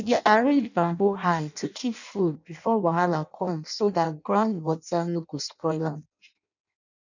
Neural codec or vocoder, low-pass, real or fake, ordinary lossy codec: codec, 16 kHz in and 24 kHz out, 0.6 kbps, FireRedTTS-2 codec; 7.2 kHz; fake; none